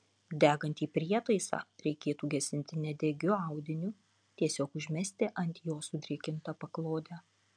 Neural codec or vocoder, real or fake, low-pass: none; real; 9.9 kHz